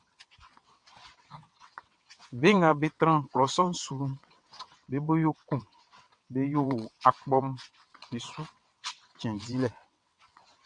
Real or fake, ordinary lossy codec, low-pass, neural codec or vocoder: fake; MP3, 96 kbps; 9.9 kHz; vocoder, 22.05 kHz, 80 mel bands, WaveNeXt